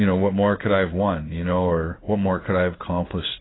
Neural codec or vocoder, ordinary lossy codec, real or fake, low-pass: codec, 16 kHz in and 24 kHz out, 1 kbps, XY-Tokenizer; AAC, 16 kbps; fake; 7.2 kHz